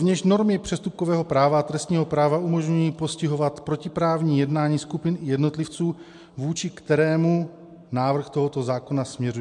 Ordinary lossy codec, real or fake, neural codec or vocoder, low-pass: MP3, 64 kbps; real; none; 10.8 kHz